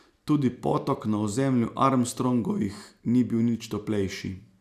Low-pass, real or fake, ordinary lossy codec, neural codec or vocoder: 14.4 kHz; real; none; none